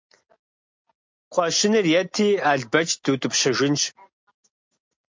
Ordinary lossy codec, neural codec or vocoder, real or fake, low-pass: MP3, 48 kbps; none; real; 7.2 kHz